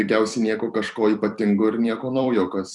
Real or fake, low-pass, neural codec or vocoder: fake; 10.8 kHz; vocoder, 44.1 kHz, 128 mel bands every 256 samples, BigVGAN v2